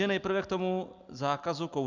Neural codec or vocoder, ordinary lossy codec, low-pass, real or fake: none; Opus, 64 kbps; 7.2 kHz; real